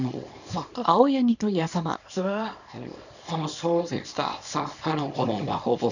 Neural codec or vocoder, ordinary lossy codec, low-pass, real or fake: codec, 24 kHz, 0.9 kbps, WavTokenizer, small release; none; 7.2 kHz; fake